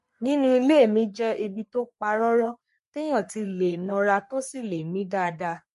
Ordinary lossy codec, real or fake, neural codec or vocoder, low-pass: MP3, 48 kbps; fake; codec, 44.1 kHz, 3.4 kbps, Pupu-Codec; 14.4 kHz